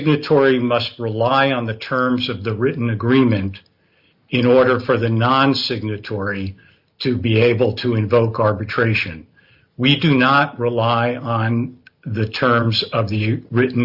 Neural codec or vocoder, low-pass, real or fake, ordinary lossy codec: none; 5.4 kHz; real; Opus, 64 kbps